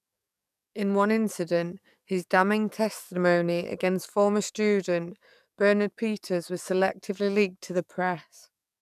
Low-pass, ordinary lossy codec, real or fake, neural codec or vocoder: 14.4 kHz; none; fake; codec, 44.1 kHz, 7.8 kbps, DAC